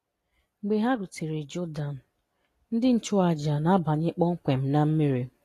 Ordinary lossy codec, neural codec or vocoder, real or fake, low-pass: AAC, 48 kbps; none; real; 14.4 kHz